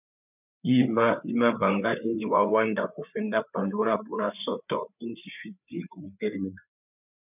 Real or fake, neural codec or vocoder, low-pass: fake; codec, 16 kHz, 4 kbps, FreqCodec, larger model; 3.6 kHz